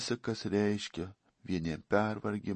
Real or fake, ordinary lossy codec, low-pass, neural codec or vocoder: real; MP3, 32 kbps; 10.8 kHz; none